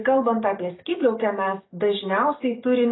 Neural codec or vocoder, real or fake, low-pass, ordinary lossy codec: none; real; 7.2 kHz; AAC, 16 kbps